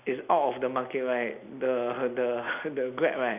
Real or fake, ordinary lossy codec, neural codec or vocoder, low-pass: real; none; none; 3.6 kHz